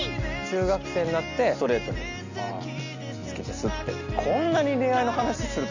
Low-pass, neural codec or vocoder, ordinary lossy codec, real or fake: 7.2 kHz; none; none; real